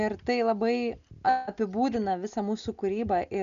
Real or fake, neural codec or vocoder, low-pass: real; none; 7.2 kHz